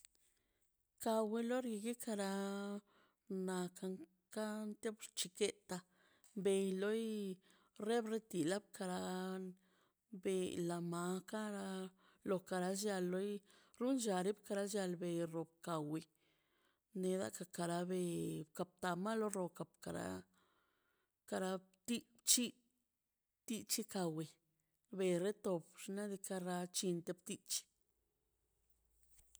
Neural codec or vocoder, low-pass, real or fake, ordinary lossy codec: none; none; real; none